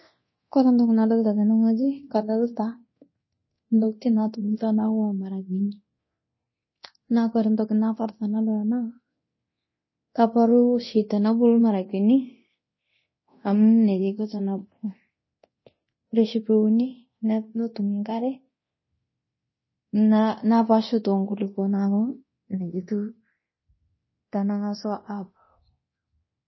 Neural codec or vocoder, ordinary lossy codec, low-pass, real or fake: codec, 24 kHz, 0.9 kbps, DualCodec; MP3, 24 kbps; 7.2 kHz; fake